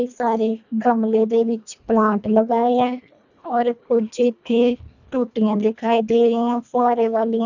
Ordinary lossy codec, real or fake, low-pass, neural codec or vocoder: none; fake; 7.2 kHz; codec, 24 kHz, 1.5 kbps, HILCodec